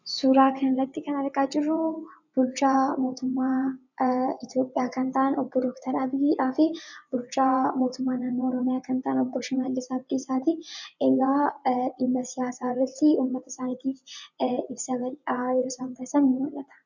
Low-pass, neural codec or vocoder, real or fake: 7.2 kHz; vocoder, 24 kHz, 100 mel bands, Vocos; fake